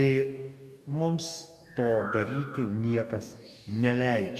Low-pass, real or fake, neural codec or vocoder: 14.4 kHz; fake; codec, 44.1 kHz, 2.6 kbps, DAC